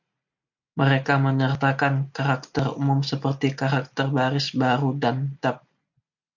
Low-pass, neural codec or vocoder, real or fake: 7.2 kHz; none; real